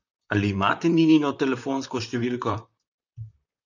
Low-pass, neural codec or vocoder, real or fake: 7.2 kHz; vocoder, 44.1 kHz, 128 mel bands, Pupu-Vocoder; fake